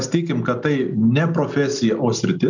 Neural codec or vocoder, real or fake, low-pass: none; real; 7.2 kHz